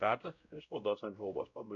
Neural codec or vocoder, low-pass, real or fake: codec, 16 kHz, 0.5 kbps, X-Codec, WavLM features, trained on Multilingual LibriSpeech; 7.2 kHz; fake